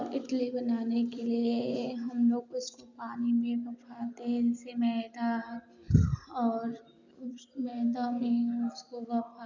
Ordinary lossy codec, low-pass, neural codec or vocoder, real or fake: none; 7.2 kHz; none; real